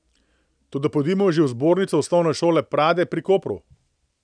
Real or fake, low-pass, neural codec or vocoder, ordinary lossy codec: real; 9.9 kHz; none; none